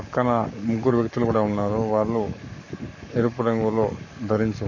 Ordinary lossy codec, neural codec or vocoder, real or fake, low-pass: none; codec, 44.1 kHz, 7.8 kbps, Pupu-Codec; fake; 7.2 kHz